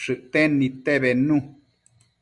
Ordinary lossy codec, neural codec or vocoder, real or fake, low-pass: Opus, 64 kbps; none; real; 10.8 kHz